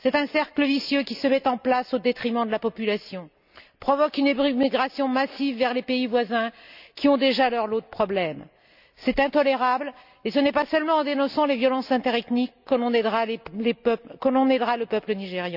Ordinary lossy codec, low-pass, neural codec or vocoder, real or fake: none; 5.4 kHz; none; real